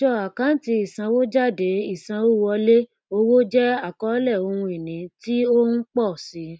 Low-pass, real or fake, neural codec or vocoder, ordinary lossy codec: none; real; none; none